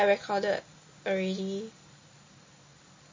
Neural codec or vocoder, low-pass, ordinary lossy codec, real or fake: none; 7.2 kHz; MP3, 32 kbps; real